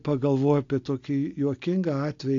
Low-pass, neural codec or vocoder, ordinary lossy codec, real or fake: 7.2 kHz; none; AAC, 48 kbps; real